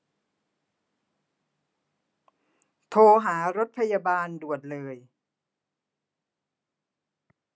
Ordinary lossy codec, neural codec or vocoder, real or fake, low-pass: none; none; real; none